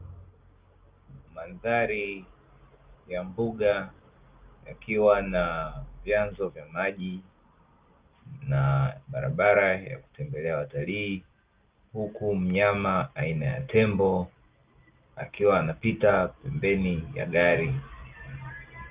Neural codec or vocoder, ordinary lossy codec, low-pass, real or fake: none; Opus, 32 kbps; 3.6 kHz; real